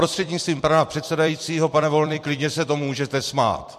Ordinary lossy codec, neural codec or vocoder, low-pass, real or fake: MP3, 64 kbps; vocoder, 44.1 kHz, 128 mel bands every 256 samples, BigVGAN v2; 14.4 kHz; fake